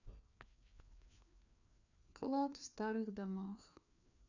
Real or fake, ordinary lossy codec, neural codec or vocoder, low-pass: fake; none; codec, 16 kHz, 2 kbps, FreqCodec, larger model; 7.2 kHz